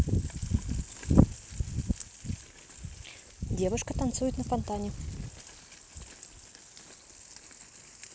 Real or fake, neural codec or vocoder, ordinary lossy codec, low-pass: real; none; none; none